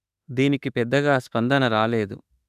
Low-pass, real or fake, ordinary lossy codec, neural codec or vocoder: 14.4 kHz; fake; none; autoencoder, 48 kHz, 32 numbers a frame, DAC-VAE, trained on Japanese speech